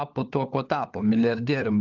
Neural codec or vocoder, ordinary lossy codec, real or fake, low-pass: codec, 16 kHz, 4 kbps, FunCodec, trained on LibriTTS, 50 frames a second; Opus, 32 kbps; fake; 7.2 kHz